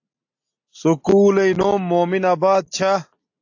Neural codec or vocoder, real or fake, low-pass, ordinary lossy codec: none; real; 7.2 kHz; AAC, 48 kbps